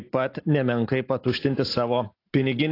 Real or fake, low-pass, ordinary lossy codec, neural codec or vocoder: real; 5.4 kHz; AAC, 32 kbps; none